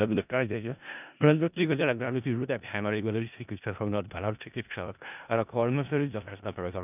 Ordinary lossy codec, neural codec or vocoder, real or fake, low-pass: none; codec, 16 kHz in and 24 kHz out, 0.4 kbps, LongCat-Audio-Codec, four codebook decoder; fake; 3.6 kHz